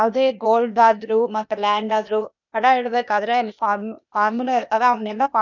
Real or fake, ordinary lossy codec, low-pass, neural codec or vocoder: fake; none; 7.2 kHz; codec, 16 kHz, 0.8 kbps, ZipCodec